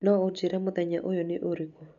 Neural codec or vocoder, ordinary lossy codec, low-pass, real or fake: none; none; 7.2 kHz; real